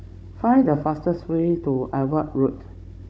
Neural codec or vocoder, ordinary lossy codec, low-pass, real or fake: codec, 16 kHz, 16 kbps, FunCodec, trained on Chinese and English, 50 frames a second; none; none; fake